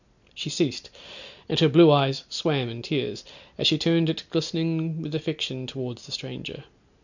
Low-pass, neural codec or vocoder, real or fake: 7.2 kHz; none; real